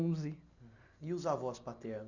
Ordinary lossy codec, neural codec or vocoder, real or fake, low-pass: none; none; real; 7.2 kHz